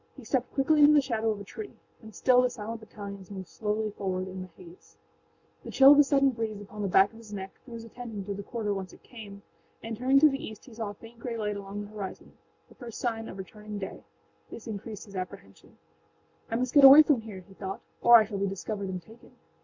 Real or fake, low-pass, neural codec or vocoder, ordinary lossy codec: real; 7.2 kHz; none; MP3, 64 kbps